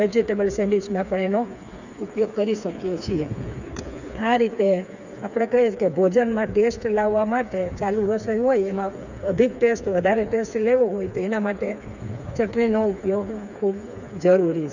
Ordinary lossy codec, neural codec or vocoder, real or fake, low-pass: none; codec, 16 kHz, 4 kbps, FreqCodec, smaller model; fake; 7.2 kHz